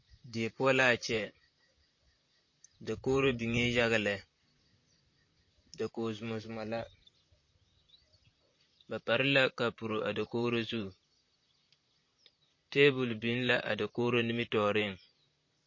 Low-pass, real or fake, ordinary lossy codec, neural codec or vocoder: 7.2 kHz; fake; MP3, 32 kbps; vocoder, 44.1 kHz, 128 mel bands, Pupu-Vocoder